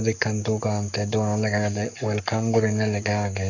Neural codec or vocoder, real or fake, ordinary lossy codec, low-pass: codec, 44.1 kHz, 7.8 kbps, Pupu-Codec; fake; none; 7.2 kHz